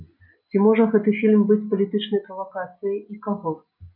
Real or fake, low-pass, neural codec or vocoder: real; 5.4 kHz; none